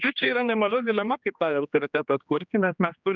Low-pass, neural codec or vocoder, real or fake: 7.2 kHz; codec, 16 kHz, 2 kbps, X-Codec, HuBERT features, trained on general audio; fake